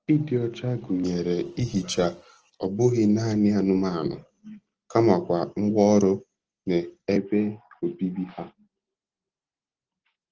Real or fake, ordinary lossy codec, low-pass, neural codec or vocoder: real; Opus, 16 kbps; 7.2 kHz; none